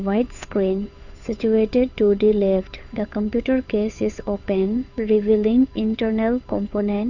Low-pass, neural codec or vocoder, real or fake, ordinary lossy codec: 7.2 kHz; codec, 16 kHz, 8 kbps, FunCodec, trained on Chinese and English, 25 frames a second; fake; none